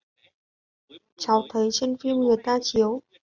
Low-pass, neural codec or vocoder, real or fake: 7.2 kHz; none; real